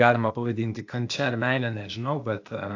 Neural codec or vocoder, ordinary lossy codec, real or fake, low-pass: codec, 16 kHz, 0.8 kbps, ZipCodec; AAC, 48 kbps; fake; 7.2 kHz